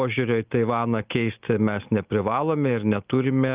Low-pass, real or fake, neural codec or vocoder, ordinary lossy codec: 3.6 kHz; real; none; Opus, 24 kbps